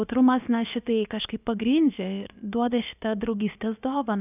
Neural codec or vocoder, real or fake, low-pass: codec, 16 kHz, about 1 kbps, DyCAST, with the encoder's durations; fake; 3.6 kHz